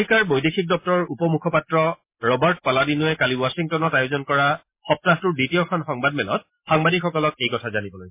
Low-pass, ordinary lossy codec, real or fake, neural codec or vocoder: 3.6 kHz; MP3, 24 kbps; real; none